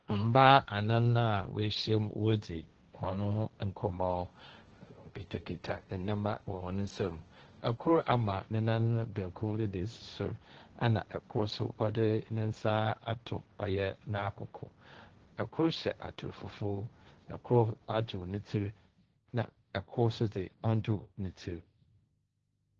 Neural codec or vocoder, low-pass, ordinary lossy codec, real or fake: codec, 16 kHz, 1.1 kbps, Voila-Tokenizer; 7.2 kHz; Opus, 16 kbps; fake